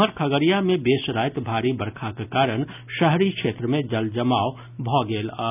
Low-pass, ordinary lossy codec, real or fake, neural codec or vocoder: 3.6 kHz; none; real; none